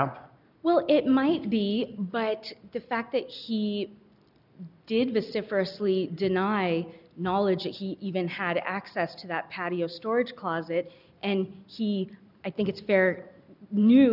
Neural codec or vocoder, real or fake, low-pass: none; real; 5.4 kHz